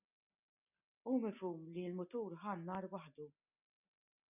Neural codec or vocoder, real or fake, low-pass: none; real; 3.6 kHz